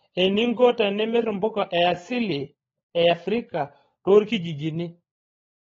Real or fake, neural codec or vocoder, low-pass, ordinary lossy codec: fake; codec, 16 kHz, 16 kbps, FunCodec, trained on LibriTTS, 50 frames a second; 7.2 kHz; AAC, 24 kbps